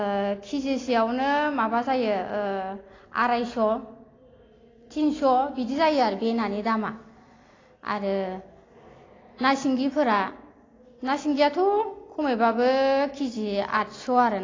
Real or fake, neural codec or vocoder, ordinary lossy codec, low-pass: real; none; AAC, 32 kbps; 7.2 kHz